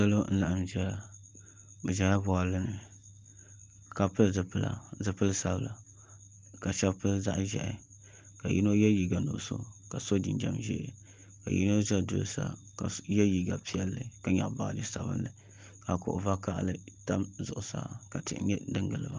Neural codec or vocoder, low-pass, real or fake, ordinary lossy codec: none; 7.2 kHz; real; Opus, 24 kbps